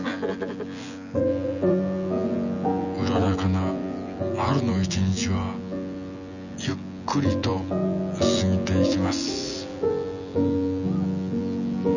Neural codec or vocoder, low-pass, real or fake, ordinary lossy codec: vocoder, 24 kHz, 100 mel bands, Vocos; 7.2 kHz; fake; none